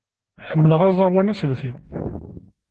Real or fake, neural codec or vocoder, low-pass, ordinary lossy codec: fake; codec, 44.1 kHz, 2.6 kbps, DAC; 10.8 kHz; Opus, 16 kbps